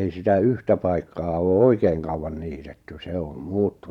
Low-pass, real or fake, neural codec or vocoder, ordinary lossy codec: 19.8 kHz; fake; vocoder, 44.1 kHz, 128 mel bands every 512 samples, BigVGAN v2; none